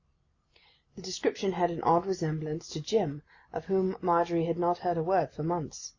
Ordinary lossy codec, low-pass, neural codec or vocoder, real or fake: Opus, 64 kbps; 7.2 kHz; none; real